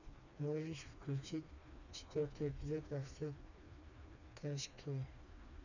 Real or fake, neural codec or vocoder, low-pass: fake; codec, 16 kHz, 2 kbps, FreqCodec, smaller model; 7.2 kHz